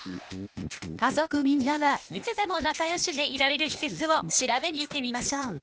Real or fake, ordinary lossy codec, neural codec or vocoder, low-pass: fake; none; codec, 16 kHz, 0.8 kbps, ZipCodec; none